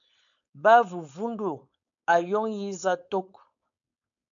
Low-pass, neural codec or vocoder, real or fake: 7.2 kHz; codec, 16 kHz, 4.8 kbps, FACodec; fake